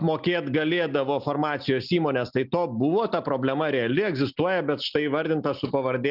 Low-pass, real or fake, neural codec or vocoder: 5.4 kHz; real; none